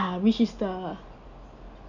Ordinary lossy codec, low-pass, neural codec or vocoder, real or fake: none; 7.2 kHz; none; real